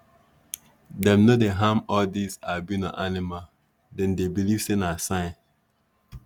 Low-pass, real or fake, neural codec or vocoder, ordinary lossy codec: 19.8 kHz; real; none; none